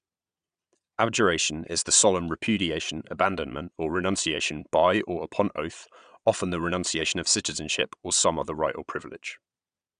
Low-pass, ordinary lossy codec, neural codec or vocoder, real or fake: 9.9 kHz; none; vocoder, 22.05 kHz, 80 mel bands, Vocos; fake